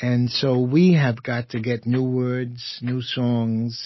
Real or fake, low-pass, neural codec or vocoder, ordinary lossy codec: real; 7.2 kHz; none; MP3, 24 kbps